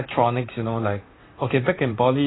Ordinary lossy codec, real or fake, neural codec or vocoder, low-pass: AAC, 16 kbps; fake; vocoder, 44.1 kHz, 128 mel bands, Pupu-Vocoder; 7.2 kHz